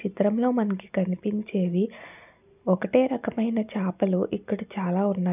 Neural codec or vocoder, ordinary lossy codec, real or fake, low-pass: none; none; real; 3.6 kHz